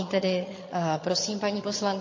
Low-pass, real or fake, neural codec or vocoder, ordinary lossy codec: 7.2 kHz; fake; vocoder, 22.05 kHz, 80 mel bands, HiFi-GAN; MP3, 32 kbps